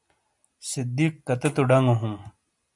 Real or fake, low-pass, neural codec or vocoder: real; 10.8 kHz; none